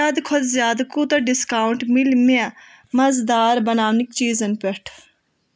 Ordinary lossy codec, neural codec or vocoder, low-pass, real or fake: none; none; none; real